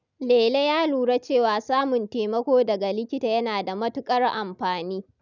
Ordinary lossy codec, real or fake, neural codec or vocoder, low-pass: none; real; none; 7.2 kHz